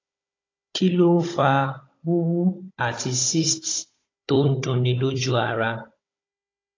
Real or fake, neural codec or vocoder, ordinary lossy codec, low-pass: fake; codec, 16 kHz, 16 kbps, FunCodec, trained on Chinese and English, 50 frames a second; AAC, 32 kbps; 7.2 kHz